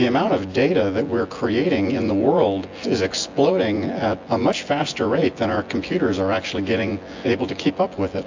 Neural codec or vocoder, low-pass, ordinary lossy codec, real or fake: vocoder, 24 kHz, 100 mel bands, Vocos; 7.2 kHz; AAC, 48 kbps; fake